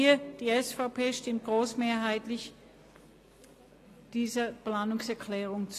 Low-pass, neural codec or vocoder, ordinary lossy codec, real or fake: 14.4 kHz; none; AAC, 48 kbps; real